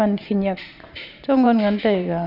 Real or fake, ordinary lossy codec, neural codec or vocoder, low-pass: fake; none; vocoder, 44.1 kHz, 128 mel bands every 512 samples, BigVGAN v2; 5.4 kHz